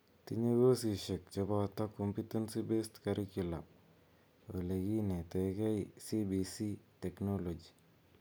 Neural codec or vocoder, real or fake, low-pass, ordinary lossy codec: none; real; none; none